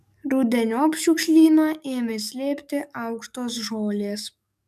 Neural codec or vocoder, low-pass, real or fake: codec, 44.1 kHz, 7.8 kbps, DAC; 14.4 kHz; fake